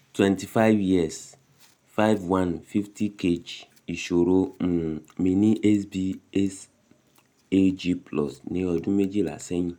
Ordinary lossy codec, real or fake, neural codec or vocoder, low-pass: none; real; none; 19.8 kHz